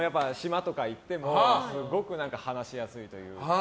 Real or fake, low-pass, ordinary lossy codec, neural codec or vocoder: real; none; none; none